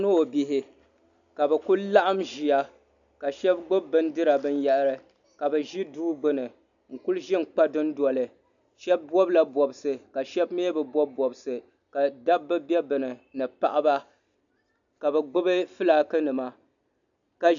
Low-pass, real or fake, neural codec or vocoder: 7.2 kHz; real; none